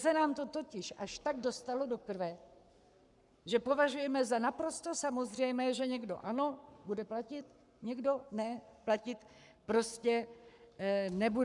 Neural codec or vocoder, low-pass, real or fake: codec, 44.1 kHz, 7.8 kbps, Pupu-Codec; 10.8 kHz; fake